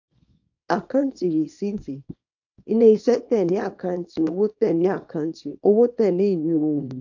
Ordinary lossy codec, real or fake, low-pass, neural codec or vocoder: AAC, 48 kbps; fake; 7.2 kHz; codec, 24 kHz, 0.9 kbps, WavTokenizer, small release